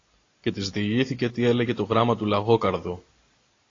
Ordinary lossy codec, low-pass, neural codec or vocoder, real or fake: AAC, 32 kbps; 7.2 kHz; none; real